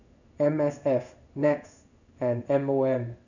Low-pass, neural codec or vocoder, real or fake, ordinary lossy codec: 7.2 kHz; codec, 16 kHz in and 24 kHz out, 1 kbps, XY-Tokenizer; fake; none